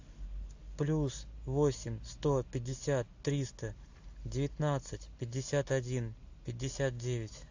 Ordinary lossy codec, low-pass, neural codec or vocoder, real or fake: AAC, 48 kbps; 7.2 kHz; none; real